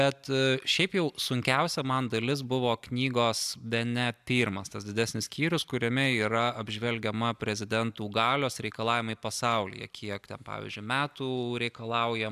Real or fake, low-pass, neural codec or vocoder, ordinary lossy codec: real; 14.4 kHz; none; AAC, 96 kbps